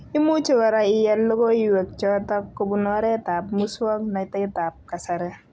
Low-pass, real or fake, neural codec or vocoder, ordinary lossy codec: none; real; none; none